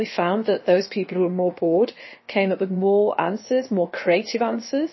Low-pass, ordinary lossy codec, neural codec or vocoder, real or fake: 7.2 kHz; MP3, 24 kbps; codec, 16 kHz, about 1 kbps, DyCAST, with the encoder's durations; fake